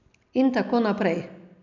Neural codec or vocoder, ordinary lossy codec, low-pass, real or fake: none; none; 7.2 kHz; real